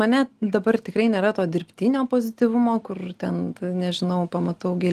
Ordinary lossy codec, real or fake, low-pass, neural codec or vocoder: Opus, 24 kbps; real; 14.4 kHz; none